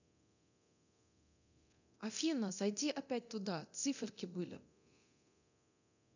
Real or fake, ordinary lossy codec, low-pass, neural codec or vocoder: fake; none; 7.2 kHz; codec, 24 kHz, 0.9 kbps, DualCodec